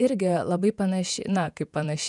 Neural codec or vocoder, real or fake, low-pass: vocoder, 48 kHz, 128 mel bands, Vocos; fake; 10.8 kHz